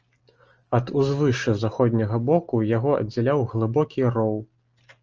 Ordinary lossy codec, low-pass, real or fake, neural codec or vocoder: Opus, 24 kbps; 7.2 kHz; real; none